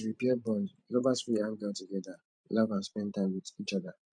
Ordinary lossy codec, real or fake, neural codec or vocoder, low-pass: none; fake; vocoder, 44.1 kHz, 128 mel bands every 512 samples, BigVGAN v2; 9.9 kHz